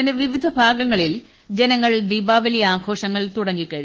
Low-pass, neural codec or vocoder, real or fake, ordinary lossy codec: 7.2 kHz; codec, 24 kHz, 1.2 kbps, DualCodec; fake; Opus, 16 kbps